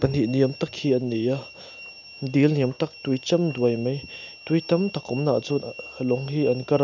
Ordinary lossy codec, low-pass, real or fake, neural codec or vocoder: none; 7.2 kHz; real; none